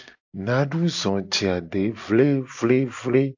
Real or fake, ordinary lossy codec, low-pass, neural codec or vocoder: real; AAC, 48 kbps; 7.2 kHz; none